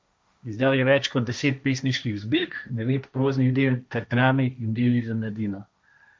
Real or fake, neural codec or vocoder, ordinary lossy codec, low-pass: fake; codec, 16 kHz, 1.1 kbps, Voila-Tokenizer; none; none